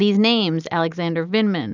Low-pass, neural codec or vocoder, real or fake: 7.2 kHz; none; real